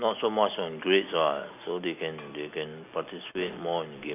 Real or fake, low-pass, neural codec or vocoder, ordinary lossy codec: real; 3.6 kHz; none; none